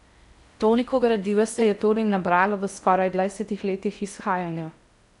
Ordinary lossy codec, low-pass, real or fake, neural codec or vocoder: none; 10.8 kHz; fake; codec, 16 kHz in and 24 kHz out, 0.6 kbps, FocalCodec, streaming, 4096 codes